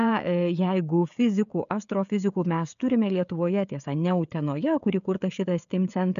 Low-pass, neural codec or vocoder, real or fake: 7.2 kHz; codec, 16 kHz, 16 kbps, FreqCodec, smaller model; fake